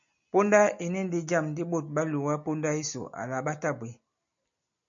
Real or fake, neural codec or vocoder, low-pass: real; none; 7.2 kHz